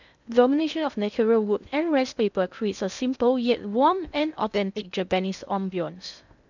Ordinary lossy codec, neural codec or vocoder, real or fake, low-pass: none; codec, 16 kHz in and 24 kHz out, 0.6 kbps, FocalCodec, streaming, 2048 codes; fake; 7.2 kHz